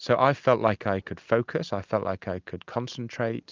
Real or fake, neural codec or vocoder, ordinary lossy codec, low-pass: real; none; Opus, 24 kbps; 7.2 kHz